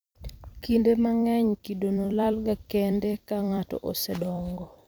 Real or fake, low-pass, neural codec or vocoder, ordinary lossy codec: fake; none; vocoder, 44.1 kHz, 128 mel bands every 256 samples, BigVGAN v2; none